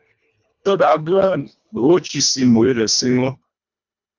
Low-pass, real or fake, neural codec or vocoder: 7.2 kHz; fake; codec, 24 kHz, 1.5 kbps, HILCodec